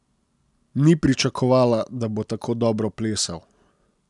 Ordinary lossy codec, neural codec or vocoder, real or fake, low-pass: none; none; real; 10.8 kHz